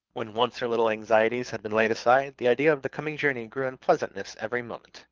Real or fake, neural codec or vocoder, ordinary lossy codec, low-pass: fake; codec, 24 kHz, 6 kbps, HILCodec; Opus, 24 kbps; 7.2 kHz